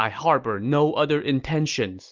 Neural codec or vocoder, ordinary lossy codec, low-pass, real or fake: none; Opus, 24 kbps; 7.2 kHz; real